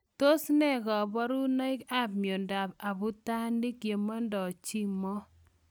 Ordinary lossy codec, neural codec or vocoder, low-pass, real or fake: none; none; none; real